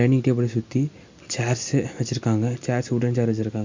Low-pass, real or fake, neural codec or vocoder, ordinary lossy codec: 7.2 kHz; real; none; MP3, 64 kbps